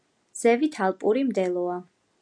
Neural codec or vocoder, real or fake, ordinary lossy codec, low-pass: none; real; MP3, 96 kbps; 9.9 kHz